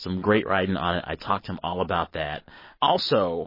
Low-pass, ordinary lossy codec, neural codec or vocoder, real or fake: 5.4 kHz; MP3, 24 kbps; none; real